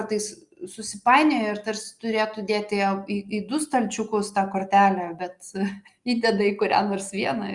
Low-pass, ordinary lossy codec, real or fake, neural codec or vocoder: 10.8 kHz; Opus, 64 kbps; real; none